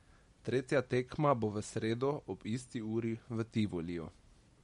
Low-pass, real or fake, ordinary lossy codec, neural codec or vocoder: 19.8 kHz; real; MP3, 48 kbps; none